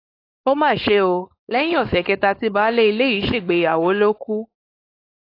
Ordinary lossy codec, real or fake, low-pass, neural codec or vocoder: AAC, 32 kbps; fake; 5.4 kHz; codec, 16 kHz, 4.8 kbps, FACodec